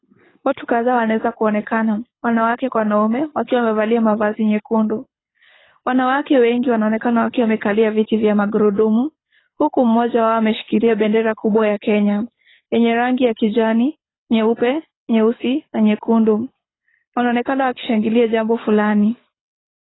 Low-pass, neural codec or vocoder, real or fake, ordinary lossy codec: 7.2 kHz; codec, 24 kHz, 6 kbps, HILCodec; fake; AAC, 16 kbps